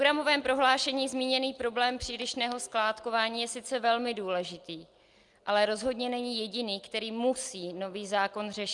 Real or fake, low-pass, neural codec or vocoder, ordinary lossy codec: real; 10.8 kHz; none; Opus, 32 kbps